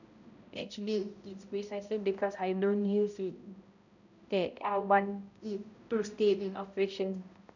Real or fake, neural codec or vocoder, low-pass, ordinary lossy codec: fake; codec, 16 kHz, 0.5 kbps, X-Codec, HuBERT features, trained on balanced general audio; 7.2 kHz; none